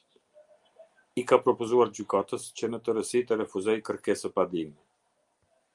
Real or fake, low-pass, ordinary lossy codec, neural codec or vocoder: real; 10.8 kHz; Opus, 32 kbps; none